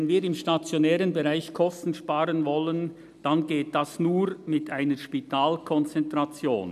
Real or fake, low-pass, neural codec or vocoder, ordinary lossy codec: real; 14.4 kHz; none; none